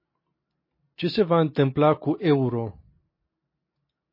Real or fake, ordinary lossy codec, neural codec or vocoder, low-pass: real; MP3, 24 kbps; none; 5.4 kHz